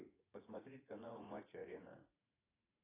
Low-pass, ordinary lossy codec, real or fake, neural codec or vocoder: 3.6 kHz; AAC, 16 kbps; fake; vocoder, 44.1 kHz, 80 mel bands, Vocos